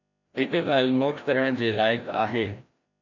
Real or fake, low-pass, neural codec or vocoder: fake; 7.2 kHz; codec, 16 kHz, 1 kbps, FreqCodec, larger model